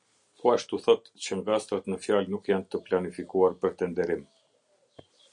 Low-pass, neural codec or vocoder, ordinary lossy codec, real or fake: 9.9 kHz; none; MP3, 96 kbps; real